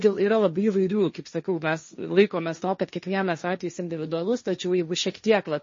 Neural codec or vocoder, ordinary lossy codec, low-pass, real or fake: codec, 16 kHz, 1.1 kbps, Voila-Tokenizer; MP3, 32 kbps; 7.2 kHz; fake